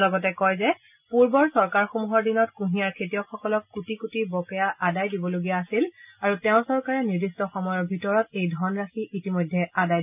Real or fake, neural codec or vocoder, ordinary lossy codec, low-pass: real; none; none; 3.6 kHz